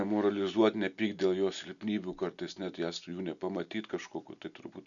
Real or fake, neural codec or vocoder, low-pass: real; none; 7.2 kHz